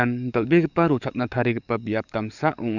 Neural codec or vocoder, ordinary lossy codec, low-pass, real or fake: codec, 44.1 kHz, 7.8 kbps, DAC; none; 7.2 kHz; fake